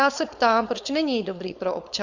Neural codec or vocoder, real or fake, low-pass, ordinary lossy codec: codec, 16 kHz, 4.8 kbps, FACodec; fake; 7.2 kHz; Opus, 64 kbps